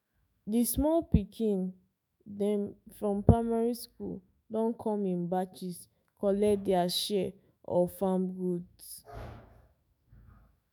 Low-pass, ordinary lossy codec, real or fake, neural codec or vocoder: none; none; fake; autoencoder, 48 kHz, 128 numbers a frame, DAC-VAE, trained on Japanese speech